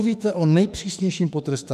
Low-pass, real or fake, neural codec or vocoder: 14.4 kHz; fake; autoencoder, 48 kHz, 32 numbers a frame, DAC-VAE, trained on Japanese speech